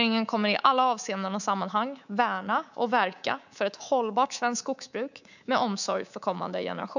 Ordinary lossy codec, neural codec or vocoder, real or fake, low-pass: none; codec, 24 kHz, 3.1 kbps, DualCodec; fake; 7.2 kHz